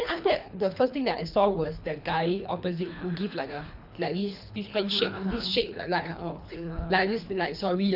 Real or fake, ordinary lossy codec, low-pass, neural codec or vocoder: fake; none; 5.4 kHz; codec, 24 kHz, 3 kbps, HILCodec